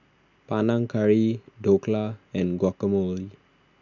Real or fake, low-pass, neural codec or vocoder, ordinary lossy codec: real; 7.2 kHz; none; none